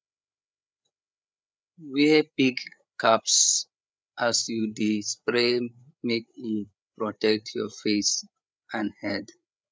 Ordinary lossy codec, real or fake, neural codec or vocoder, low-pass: none; fake; codec, 16 kHz, 8 kbps, FreqCodec, larger model; none